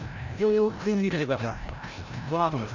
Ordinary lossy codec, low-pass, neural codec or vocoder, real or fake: none; 7.2 kHz; codec, 16 kHz, 0.5 kbps, FreqCodec, larger model; fake